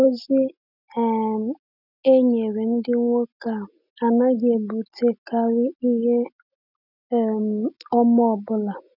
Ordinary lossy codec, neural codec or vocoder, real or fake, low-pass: none; none; real; 5.4 kHz